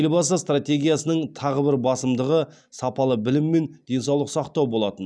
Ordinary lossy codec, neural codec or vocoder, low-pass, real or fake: none; none; none; real